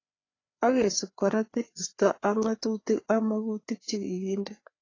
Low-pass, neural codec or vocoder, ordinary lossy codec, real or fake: 7.2 kHz; codec, 16 kHz, 4 kbps, FreqCodec, larger model; AAC, 32 kbps; fake